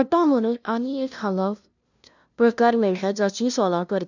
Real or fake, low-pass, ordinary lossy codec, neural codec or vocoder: fake; 7.2 kHz; none; codec, 16 kHz, 0.5 kbps, FunCodec, trained on LibriTTS, 25 frames a second